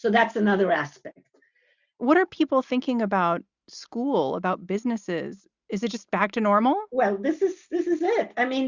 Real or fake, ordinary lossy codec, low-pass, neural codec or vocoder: real; Opus, 64 kbps; 7.2 kHz; none